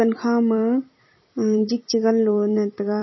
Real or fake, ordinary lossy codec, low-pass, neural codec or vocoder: fake; MP3, 24 kbps; 7.2 kHz; vocoder, 44.1 kHz, 128 mel bands every 256 samples, BigVGAN v2